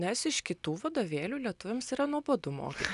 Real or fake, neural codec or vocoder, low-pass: real; none; 10.8 kHz